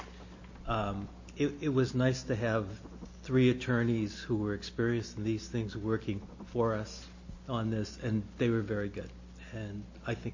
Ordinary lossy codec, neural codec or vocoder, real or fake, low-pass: MP3, 32 kbps; none; real; 7.2 kHz